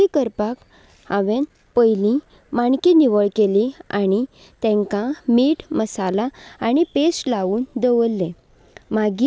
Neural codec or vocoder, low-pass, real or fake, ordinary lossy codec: none; none; real; none